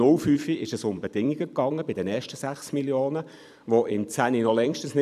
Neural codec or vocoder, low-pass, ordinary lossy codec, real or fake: vocoder, 44.1 kHz, 128 mel bands every 256 samples, BigVGAN v2; 14.4 kHz; none; fake